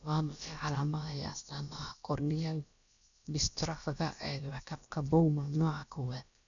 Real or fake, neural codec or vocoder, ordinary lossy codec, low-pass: fake; codec, 16 kHz, about 1 kbps, DyCAST, with the encoder's durations; none; 7.2 kHz